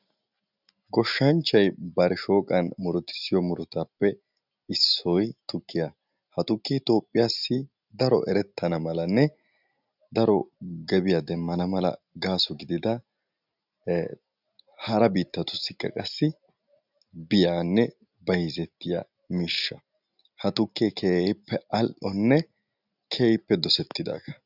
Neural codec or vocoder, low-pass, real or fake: none; 5.4 kHz; real